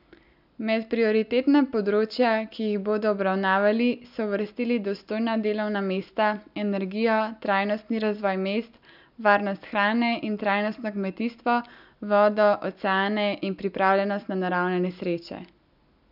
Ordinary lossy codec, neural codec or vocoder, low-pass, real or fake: none; none; 5.4 kHz; real